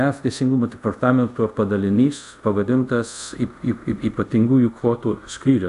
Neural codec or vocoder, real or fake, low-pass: codec, 24 kHz, 0.5 kbps, DualCodec; fake; 10.8 kHz